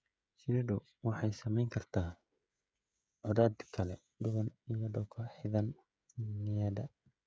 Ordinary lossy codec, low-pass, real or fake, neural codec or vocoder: none; none; fake; codec, 16 kHz, 16 kbps, FreqCodec, smaller model